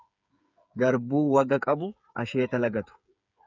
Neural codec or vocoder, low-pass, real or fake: codec, 16 kHz, 8 kbps, FreqCodec, smaller model; 7.2 kHz; fake